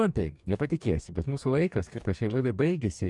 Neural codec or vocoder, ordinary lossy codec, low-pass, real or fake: codec, 44.1 kHz, 2.6 kbps, DAC; MP3, 96 kbps; 10.8 kHz; fake